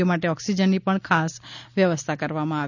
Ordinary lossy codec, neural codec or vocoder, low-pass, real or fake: none; none; 7.2 kHz; real